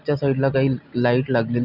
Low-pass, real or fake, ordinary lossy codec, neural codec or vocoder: 5.4 kHz; real; none; none